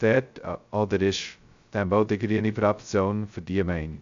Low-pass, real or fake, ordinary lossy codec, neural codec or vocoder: 7.2 kHz; fake; AAC, 64 kbps; codec, 16 kHz, 0.2 kbps, FocalCodec